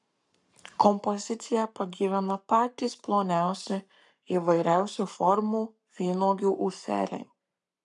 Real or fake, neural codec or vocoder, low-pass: fake; codec, 44.1 kHz, 7.8 kbps, Pupu-Codec; 10.8 kHz